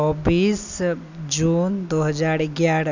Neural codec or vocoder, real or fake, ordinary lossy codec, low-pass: none; real; none; 7.2 kHz